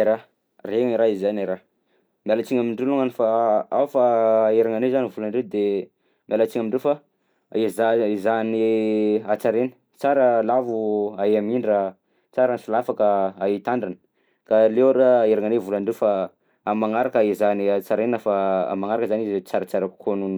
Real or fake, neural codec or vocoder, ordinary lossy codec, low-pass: real; none; none; none